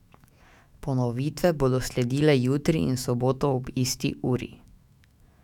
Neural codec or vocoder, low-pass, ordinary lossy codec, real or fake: autoencoder, 48 kHz, 128 numbers a frame, DAC-VAE, trained on Japanese speech; 19.8 kHz; none; fake